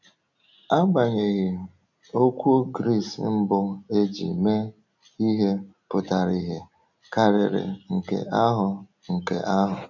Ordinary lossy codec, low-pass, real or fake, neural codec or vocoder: none; 7.2 kHz; real; none